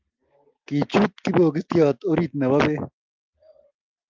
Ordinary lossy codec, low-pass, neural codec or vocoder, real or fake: Opus, 24 kbps; 7.2 kHz; none; real